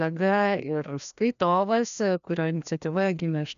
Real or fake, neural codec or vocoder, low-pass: fake; codec, 16 kHz, 1 kbps, FreqCodec, larger model; 7.2 kHz